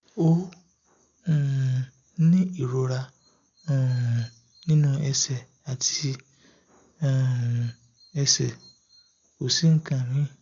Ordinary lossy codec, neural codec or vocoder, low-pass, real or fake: MP3, 64 kbps; none; 7.2 kHz; real